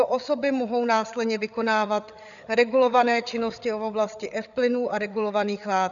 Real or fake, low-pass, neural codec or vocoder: fake; 7.2 kHz; codec, 16 kHz, 8 kbps, FreqCodec, larger model